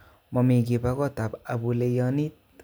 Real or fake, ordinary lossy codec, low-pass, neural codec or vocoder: real; none; none; none